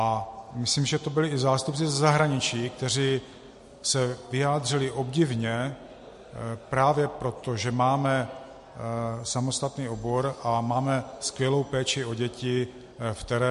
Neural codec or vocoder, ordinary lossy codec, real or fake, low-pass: none; MP3, 48 kbps; real; 14.4 kHz